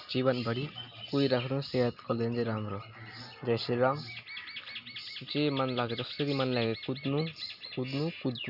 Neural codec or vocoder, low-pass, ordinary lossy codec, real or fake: none; 5.4 kHz; none; real